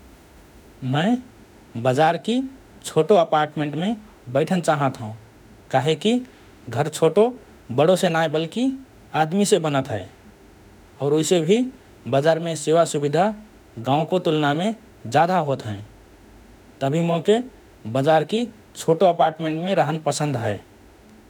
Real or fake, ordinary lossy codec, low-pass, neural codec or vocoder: fake; none; none; autoencoder, 48 kHz, 32 numbers a frame, DAC-VAE, trained on Japanese speech